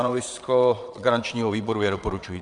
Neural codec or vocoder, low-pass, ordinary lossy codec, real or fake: vocoder, 22.05 kHz, 80 mel bands, WaveNeXt; 9.9 kHz; MP3, 96 kbps; fake